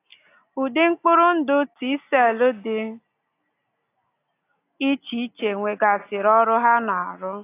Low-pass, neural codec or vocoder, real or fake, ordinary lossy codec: 3.6 kHz; none; real; AAC, 24 kbps